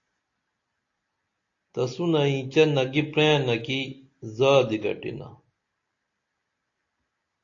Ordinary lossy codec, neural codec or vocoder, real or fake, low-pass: AAC, 48 kbps; none; real; 7.2 kHz